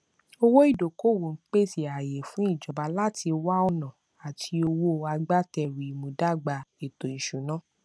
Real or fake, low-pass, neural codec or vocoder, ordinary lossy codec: real; none; none; none